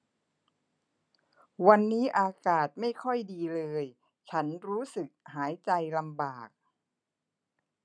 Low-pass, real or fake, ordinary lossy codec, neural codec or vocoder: 9.9 kHz; real; none; none